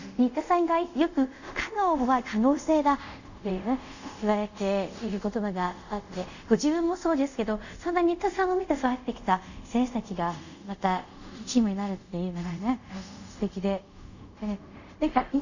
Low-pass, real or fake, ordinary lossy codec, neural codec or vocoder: 7.2 kHz; fake; none; codec, 24 kHz, 0.5 kbps, DualCodec